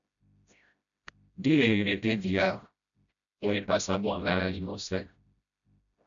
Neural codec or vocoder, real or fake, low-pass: codec, 16 kHz, 0.5 kbps, FreqCodec, smaller model; fake; 7.2 kHz